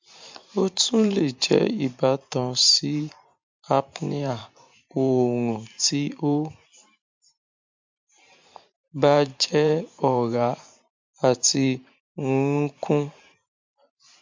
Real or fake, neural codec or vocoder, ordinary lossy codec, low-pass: fake; vocoder, 44.1 kHz, 128 mel bands every 512 samples, BigVGAN v2; MP3, 64 kbps; 7.2 kHz